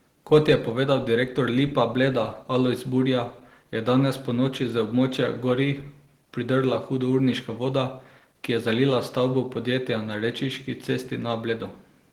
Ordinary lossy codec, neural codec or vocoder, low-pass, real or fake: Opus, 16 kbps; none; 19.8 kHz; real